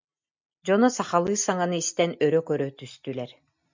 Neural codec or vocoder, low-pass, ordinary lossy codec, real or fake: none; 7.2 kHz; MP3, 64 kbps; real